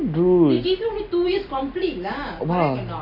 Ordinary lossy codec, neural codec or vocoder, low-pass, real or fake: Opus, 64 kbps; none; 5.4 kHz; real